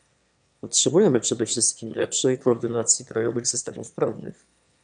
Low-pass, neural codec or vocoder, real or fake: 9.9 kHz; autoencoder, 22.05 kHz, a latent of 192 numbers a frame, VITS, trained on one speaker; fake